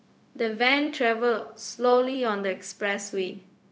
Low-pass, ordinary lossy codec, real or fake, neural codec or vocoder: none; none; fake; codec, 16 kHz, 0.4 kbps, LongCat-Audio-Codec